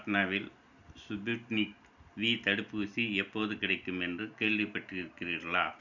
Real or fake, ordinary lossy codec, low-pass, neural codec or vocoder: real; none; 7.2 kHz; none